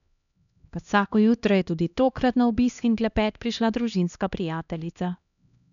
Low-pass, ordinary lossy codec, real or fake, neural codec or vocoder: 7.2 kHz; none; fake; codec, 16 kHz, 1 kbps, X-Codec, HuBERT features, trained on LibriSpeech